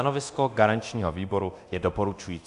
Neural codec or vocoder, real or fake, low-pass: codec, 24 kHz, 0.9 kbps, DualCodec; fake; 10.8 kHz